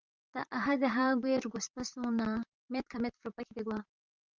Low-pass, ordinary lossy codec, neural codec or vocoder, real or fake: 7.2 kHz; Opus, 24 kbps; vocoder, 44.1 kHz, 128 mel bands, Pupu-Vocoder; fake